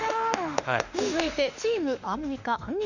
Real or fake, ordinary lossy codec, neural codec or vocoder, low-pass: fake; none; autoencoder, 48 kHz, 32 numbers a frame, DAC-VAE, trained on Japanese speech; 7.2 kHz